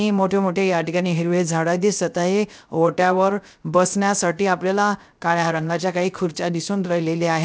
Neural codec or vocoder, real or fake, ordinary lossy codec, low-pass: codec, 16 kHz, 0.3 kbps, FocalCodec; fake; none; none